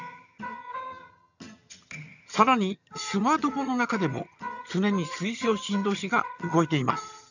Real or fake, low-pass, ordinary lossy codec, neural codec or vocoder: fake; 7.2 kHz; none; vocoder, 22.05 kHz, 80 mel bands, HiFi-GAN